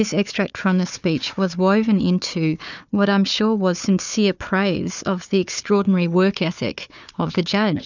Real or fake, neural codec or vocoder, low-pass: fake; codec, 16 kHz, 4 kbps, FunCodec, trained on Chinese and English, 50 frames a second; 7.2 kHz